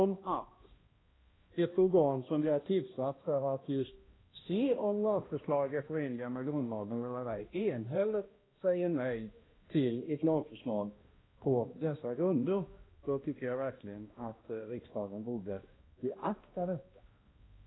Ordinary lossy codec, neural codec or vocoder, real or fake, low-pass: AAC, 16 kbps; codec, 16 kHz, 1 kbps, X-Codec, HuBERT features, trained on balanced general audio; fake; 7.2 kHz